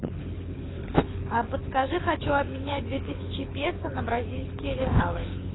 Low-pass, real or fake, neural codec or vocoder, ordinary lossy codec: 7.2 kHz; fake; codec, 24 kHz, 6 kbps, HILCodec; AAC, 16 kbps